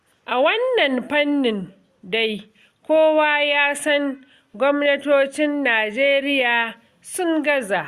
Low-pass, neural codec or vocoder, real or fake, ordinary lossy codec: 14.4 kHz; none; real; Opus, 64 kbps